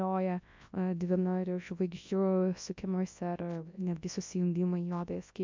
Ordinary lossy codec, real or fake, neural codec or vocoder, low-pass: MP3, 48 kbps; fake; codec, 24 kHz, 0.9 kbps, WavTokenizer, large speech release; 7.2 kHz